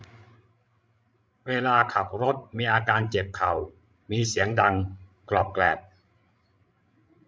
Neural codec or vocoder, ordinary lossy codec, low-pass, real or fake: codec, 16 kHz, 16 kbps, FreqCodec, larger model; none; none; fake